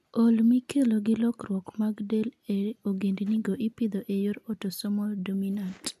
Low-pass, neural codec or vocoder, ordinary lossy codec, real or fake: 14.4 kHz; none; none; real